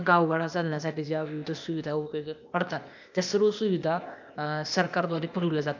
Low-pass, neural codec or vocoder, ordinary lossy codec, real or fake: 7.2 kHz; codec, 16 kHz, 0.8 kbps, ZipCodec; none; fake